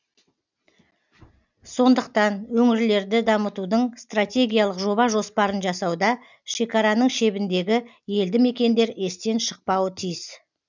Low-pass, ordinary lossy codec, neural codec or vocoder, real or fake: 7.2 kHz; none; none; real